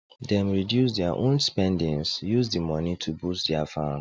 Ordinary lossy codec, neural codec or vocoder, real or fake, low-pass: none; none; real; none